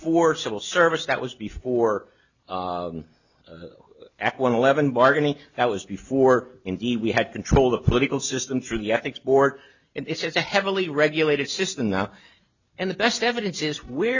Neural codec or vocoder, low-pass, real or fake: none; 7.2 kHz; real